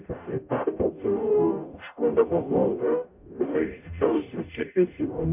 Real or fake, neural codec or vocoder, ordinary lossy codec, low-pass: fake; codec, 44.1 kHz, 0.9 kbps, DAC; MP3, 32 kbps; 3.6 kHz